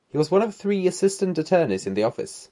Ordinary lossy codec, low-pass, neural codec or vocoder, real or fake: MP3, 96 kbps; 10.8 kHz; none; real